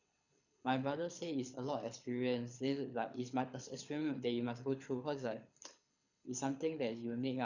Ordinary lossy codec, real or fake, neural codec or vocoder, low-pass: none; fake; codec, 24 kHz, 6 kbps, HILCodec; 7.2 kHz